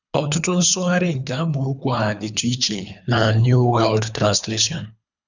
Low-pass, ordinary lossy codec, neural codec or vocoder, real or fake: 7.2 kHz; none; codec, 24 kHz, 3 kbps, HILCodec; fake